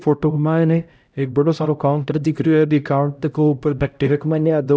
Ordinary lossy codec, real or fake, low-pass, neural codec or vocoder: none; fake; none; codec, 16 kHz, 0.5 kbps, X-Codec, HuBERT features, trained on LibriSpeech